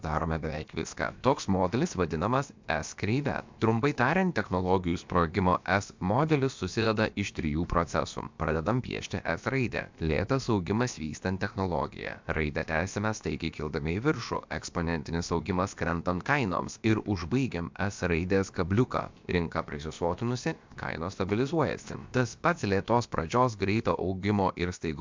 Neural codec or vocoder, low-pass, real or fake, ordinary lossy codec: codec, 16 kHz, about 1 kbps, DyCAST, with the encoder's durations; 7.2 kHz; fake; MP3, 64 kbps